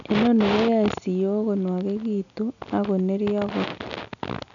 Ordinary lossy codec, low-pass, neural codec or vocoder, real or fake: none; 7.2 kHz; none; real